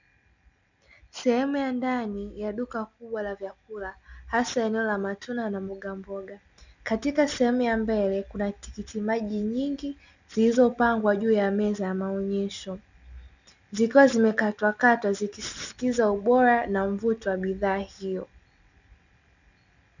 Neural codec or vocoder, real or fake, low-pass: none; real; 7.2 kHz